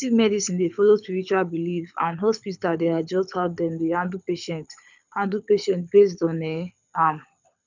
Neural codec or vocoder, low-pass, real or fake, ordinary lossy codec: codec, 24 kHz, 6 kbps, HILCodec; 7.2 kHz; fake; none